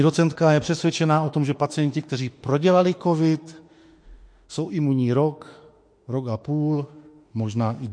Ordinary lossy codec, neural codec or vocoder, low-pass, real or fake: MP3, 48 kbps; autoencoder, 48 kHz, 32 numbers a frame, DAC-VAE, trained on Japanese speech; 9.9 kHz; fake